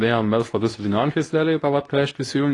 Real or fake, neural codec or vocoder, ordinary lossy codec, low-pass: fake; codec, 24 kHz, 0.9 kbps, WavTokenizer, medium speech release version 2; AAC, 32 kbps; 10.8 kHz